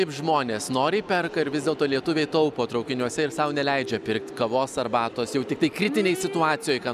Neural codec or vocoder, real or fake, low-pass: none; real; 14.4 kHz